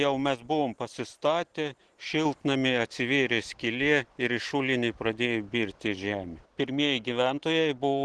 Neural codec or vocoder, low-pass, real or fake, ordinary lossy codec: none; 10.8 kHz; real; Opus, 16 kbps